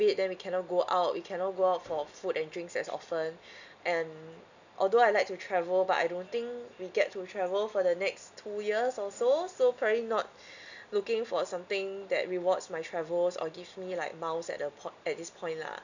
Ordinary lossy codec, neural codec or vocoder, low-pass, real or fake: none; none; 7.2 kHz; real